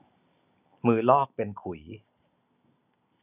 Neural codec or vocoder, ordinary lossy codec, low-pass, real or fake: none; none; 3.6 kHz; real